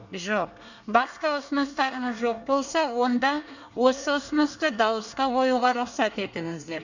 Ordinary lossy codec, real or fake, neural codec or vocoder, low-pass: none; fake; codec, 24 kHz, 1 kbps, SNAC; 7.2 kHz